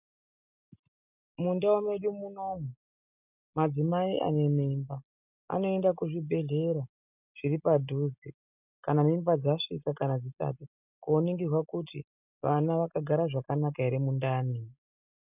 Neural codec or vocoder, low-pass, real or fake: none; 3.6 kHz; real